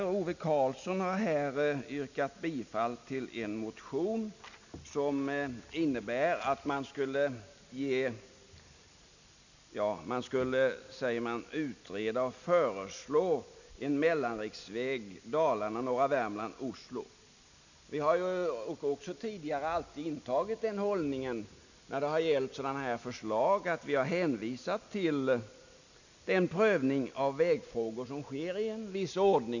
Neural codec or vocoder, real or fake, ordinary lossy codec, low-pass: none; real; none; 7.2 kHz